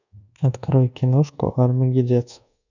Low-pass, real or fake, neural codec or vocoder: 7.2 kHz; fake; codec, 24 kHz, 1.2 kbps, DualCodec